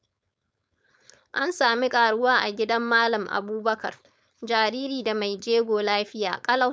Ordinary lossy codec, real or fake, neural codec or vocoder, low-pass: none; fake; codec, 16 kHz, 4.8 kbps, FACodec; none